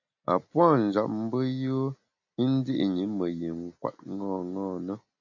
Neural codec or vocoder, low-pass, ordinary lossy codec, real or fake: none; 7.2 kHz; AAC, 48 kbps; real